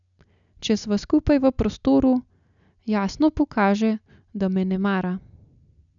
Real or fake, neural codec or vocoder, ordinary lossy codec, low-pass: real; none; none; 7.2 kHz